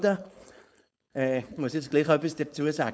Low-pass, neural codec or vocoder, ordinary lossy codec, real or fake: none; codec, 16 kHz, 4.8 kbps, FACodec; none; fake